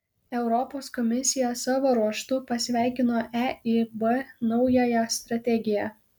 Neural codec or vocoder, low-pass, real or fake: none; 19.8 kHz; real